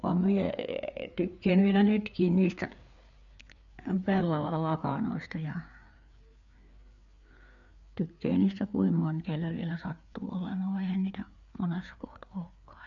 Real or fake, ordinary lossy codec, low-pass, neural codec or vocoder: fake; none; 7.2 kHz; codec, 16 kHz, 4 kbps, FreqCodec, larger model